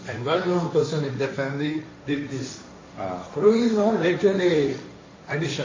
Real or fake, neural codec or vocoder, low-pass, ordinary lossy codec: fake; codec, 16 kHz, 1.1 kbps, Voila-Tokenizer; 7.2 kHz; MP3, 32 kbps